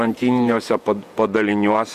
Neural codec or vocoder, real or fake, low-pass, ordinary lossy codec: vocoder, 48 kHz, 128 mel bands, Vocos; fake; 14.4 kHz; Opus, 64 kbps